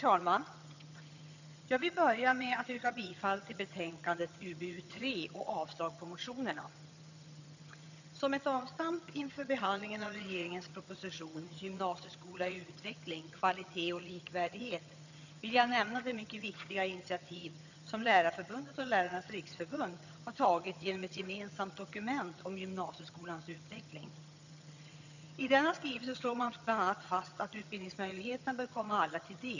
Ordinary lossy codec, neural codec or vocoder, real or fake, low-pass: none; vocoder, 22.05 kHz, 80 mel bands, HiFi-GAN; fake; 7.2 kHz